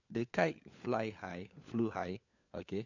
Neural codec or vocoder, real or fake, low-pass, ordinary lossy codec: codec, 16 kHz, 4 kbps, FunCodec, trained on LibriTTS, 50 frames a second; fake; 7.2 kHz; none